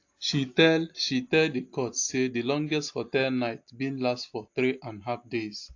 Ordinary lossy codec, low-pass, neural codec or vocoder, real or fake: AAC, 48 kbps; 7.2 kHz; none; real